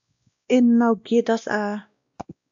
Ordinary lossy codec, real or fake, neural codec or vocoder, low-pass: AAC, 64 kbps; fake; codec, 16 kHz, 1 kbps, X-Codec, WavLM features, trained on Multilingual LibriSpeech; 7.2 kHz